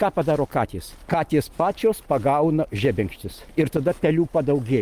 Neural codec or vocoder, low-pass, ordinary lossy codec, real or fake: none; 14.4 kHz; Opus, 32 kbps; real